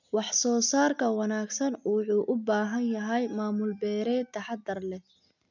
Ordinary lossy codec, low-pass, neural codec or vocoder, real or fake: none; 7.2 kHz; none; real